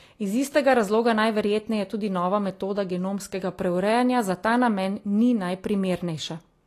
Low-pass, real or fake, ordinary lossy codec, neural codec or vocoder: 14.4 kHz; real; AAC, 48 kbps; none